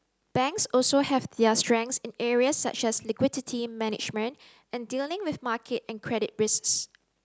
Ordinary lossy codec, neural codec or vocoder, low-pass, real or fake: none; none; none; real